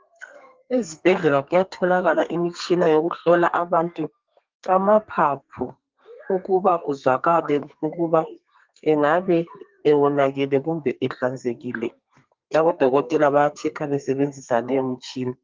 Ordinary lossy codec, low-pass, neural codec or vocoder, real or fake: Opus, 32 kbps; 7.2 kHz; codec, 32 kHz, 1.9 kbps, SNAC; fake